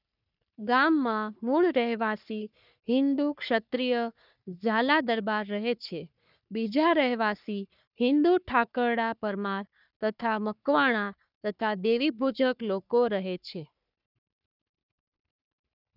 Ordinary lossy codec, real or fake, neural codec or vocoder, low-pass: none; fake; codec, 44.1 kHz, 3.4 kbps, Pupu-Codec; 5.4 kHz